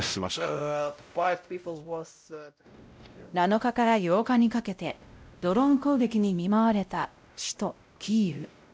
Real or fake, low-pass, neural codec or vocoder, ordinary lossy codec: fake; none; codec, 16 kHz, 0.5 kbps, X-Codec, WavLM features, trained on Multilingual LibriSpeech; none